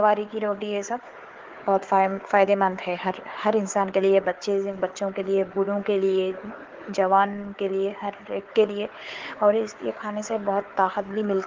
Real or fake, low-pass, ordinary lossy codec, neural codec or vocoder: fake; 7.2 kHz; Opus, 16 kbps; codec, 16 kHz, 4 kbps, FunCodec, trained on Chinese and English, 50 frames a second